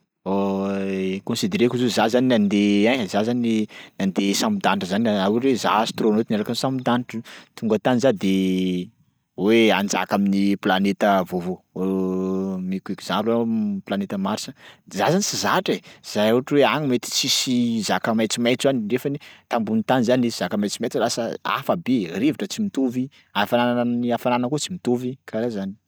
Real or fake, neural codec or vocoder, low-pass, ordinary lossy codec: real; none; none; none